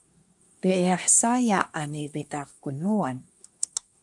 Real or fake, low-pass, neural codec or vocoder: fake; 10.8 kHz; codec, 24 kHz, 1 kbps, SNAC